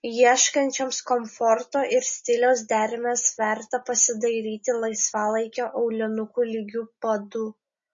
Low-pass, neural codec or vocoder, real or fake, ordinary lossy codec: 7.2 kHz; none; real; MP3, 32 kbps